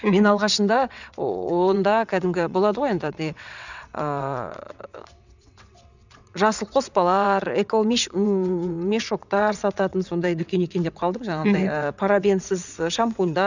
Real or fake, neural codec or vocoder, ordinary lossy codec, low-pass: fake; vocoder, 22.05 kHz, 80 mel bands, WaveNeXt; none; 7.2 kHz